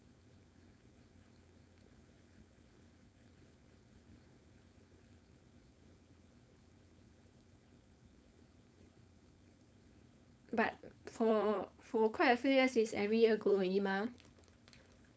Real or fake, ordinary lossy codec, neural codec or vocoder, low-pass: fake; none; codec, 16 kHz, 4.8 kbps, FACodec; none